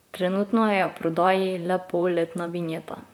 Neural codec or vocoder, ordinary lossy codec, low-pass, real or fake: vocoder, 44.1 kHz, 128 mel bands, Pupu-Vocoder; none; 19.8 kHz; fake